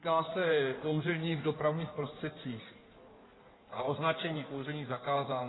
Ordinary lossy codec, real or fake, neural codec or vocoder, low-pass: AAC, 16 kbps; fake; codec, 16 kHz in and 24 kHz out, 1.1 kbps, FireRedTTS-2 codec; 7.2 kHz